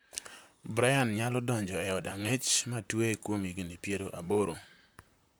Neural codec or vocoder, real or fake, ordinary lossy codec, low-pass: vocoder, 44.1 kHz, 128 mel bands, Pupu-Vocoder; fake; none; none